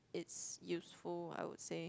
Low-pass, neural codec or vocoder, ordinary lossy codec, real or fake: none; none; none; real